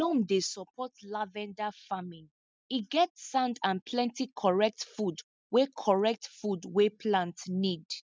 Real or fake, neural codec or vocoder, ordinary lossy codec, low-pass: real; none; none; none